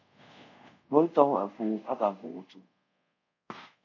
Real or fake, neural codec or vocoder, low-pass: fake; codec, 24 kHz, 0.5 kbps, DualCodec; 7.2 kHz